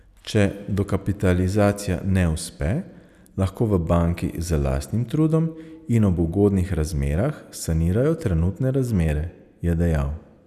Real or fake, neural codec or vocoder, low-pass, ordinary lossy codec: real; none; 14.4 kHz; none